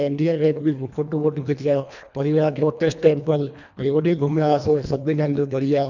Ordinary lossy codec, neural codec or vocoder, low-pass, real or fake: none; codec, 24 kHz, 1.5 kbps, HILCodec; 7.2 kHz; fake